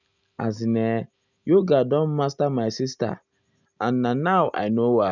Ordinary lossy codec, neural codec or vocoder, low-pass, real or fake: none; none; 7.2 kHz; real